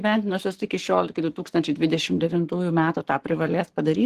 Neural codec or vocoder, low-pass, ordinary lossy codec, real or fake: codec, 44.1 kHz, 7.8 kbps, Pupu-Codec; 14.4 kHz; Opus, 16 kbps; fake